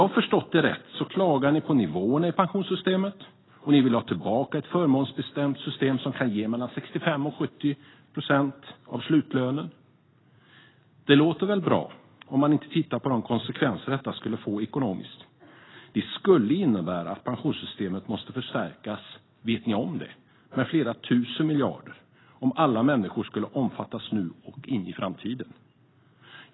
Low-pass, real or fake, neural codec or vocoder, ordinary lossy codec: 7.2 kHz; real; none; AAC, 16 kbps